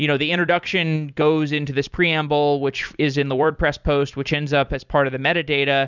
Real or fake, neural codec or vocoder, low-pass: fake; vocoder, 44.1 kHz, 128 mel bands every 256 samples, BigVGAN v2; 7.2 kHz